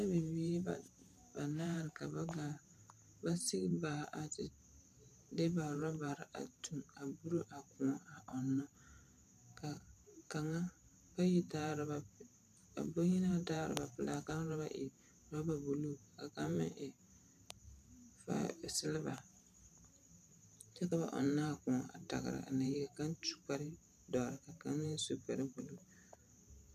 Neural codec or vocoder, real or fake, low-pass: vocoder, 44.1 kHz, 128 mel bands every 512 samples, BigVGAN v2; fake; 14.4 kHz